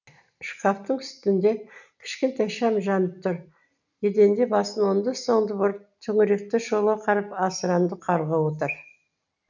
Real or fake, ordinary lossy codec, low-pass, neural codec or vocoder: real; none; 7.2 kHz; none